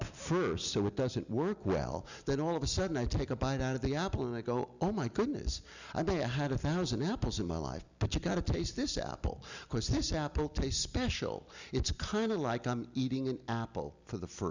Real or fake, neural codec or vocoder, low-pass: real; none; 7.2 kHz